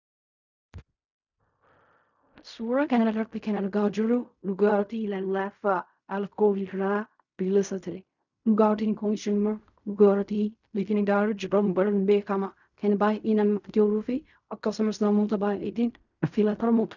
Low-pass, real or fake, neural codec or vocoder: 7.2 kHz; fake; codec, 16 kHz in and 24 kHz out, 0.4 kbps, LongCat-Audio-Codec, fine tuned four codebook decoder